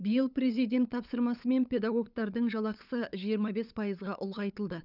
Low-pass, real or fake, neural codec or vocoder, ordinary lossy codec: 5.4 kHz; fake; codec, 16 kHz, 8 kbps, FunCodec, trained on LibriTTS, 25 frames a second; none